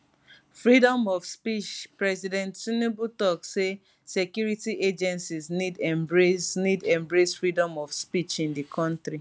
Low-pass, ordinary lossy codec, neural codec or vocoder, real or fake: none; none; none; real